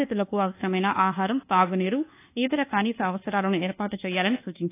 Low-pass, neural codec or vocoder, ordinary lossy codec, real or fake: 3.6 kHz; codec, 24 kHz, 1.2 kbps, DualCodec; AAC, 24 kbps; fake